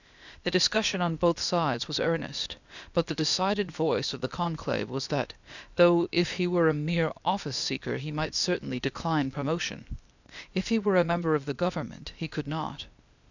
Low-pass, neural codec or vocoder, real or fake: 7.2 kHz; codec, 16 kHz, 0.8 kbps, ZipCodec; fake